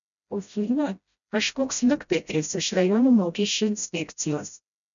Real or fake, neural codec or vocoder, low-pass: fake; codec, 16 kHz, 0.5 kbps, FreqCodec, smaller model; 7.2 kHz